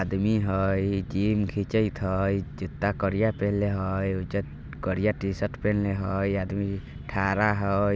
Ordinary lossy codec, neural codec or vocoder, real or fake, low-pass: none; none; real; none